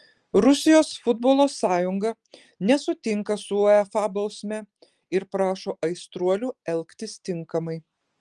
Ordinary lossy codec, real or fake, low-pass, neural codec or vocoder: Opus, 24 kbps; real; 9.9 kHz; none